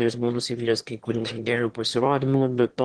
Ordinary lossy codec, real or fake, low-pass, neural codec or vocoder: Opus, 16 kbps; fake; 9.9 kHz; autoencoder, 22.05 kHz, a latent of 192 numbers a frame, VITS, trained on one speaker